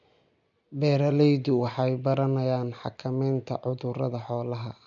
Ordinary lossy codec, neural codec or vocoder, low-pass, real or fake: MP3, 64 kbps; none; 7.2 kHz; real